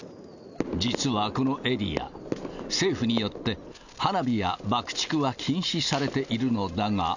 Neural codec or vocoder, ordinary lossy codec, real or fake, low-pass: none; none; real; 7.2 kHz